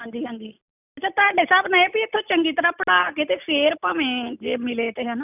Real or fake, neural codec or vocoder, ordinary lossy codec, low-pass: real; none; none; 3.6 kHz